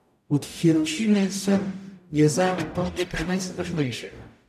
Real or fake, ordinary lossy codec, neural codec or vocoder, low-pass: fake; none; codec, 44.1 kHz, 0.9 kbps, DAC; 14.4 kHz